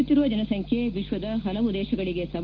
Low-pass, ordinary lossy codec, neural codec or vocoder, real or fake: 7.2 kHz; Opus, 16 kbps; none; real